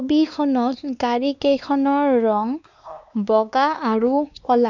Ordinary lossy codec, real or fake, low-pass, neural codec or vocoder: none; fake; 7.2 kHz; codec, 16 kHz, 2 kbps, X-Codec, WavLM features, trained on Multilingual LibriSpeech